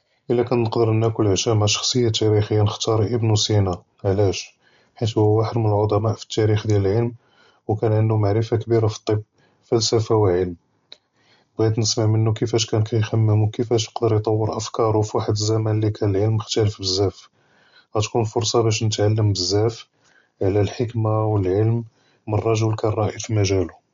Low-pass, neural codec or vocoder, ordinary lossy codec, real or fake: 7.2 kHz; none; MP3, 48 kbps; real